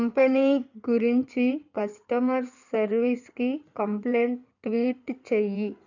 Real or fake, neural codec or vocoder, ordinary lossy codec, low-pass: fake; codec, 16 kHz in and 24 kHz out, 2.2 kbps, FireRedTTS-2 codec; none; 7.2 kHz